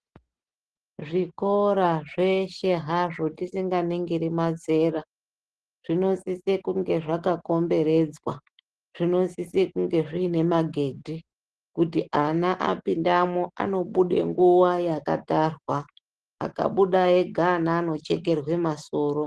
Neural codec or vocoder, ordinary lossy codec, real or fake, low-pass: none; Opus, 16 kbps; real; 10.8 kHz